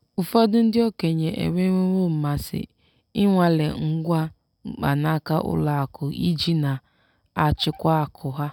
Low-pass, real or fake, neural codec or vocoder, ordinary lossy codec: 19.8 kHz; real; none; none